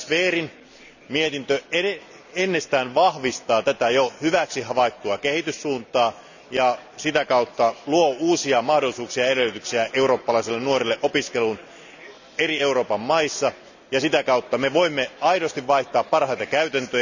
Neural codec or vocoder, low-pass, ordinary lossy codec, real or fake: none; 7.2 kHz; none; real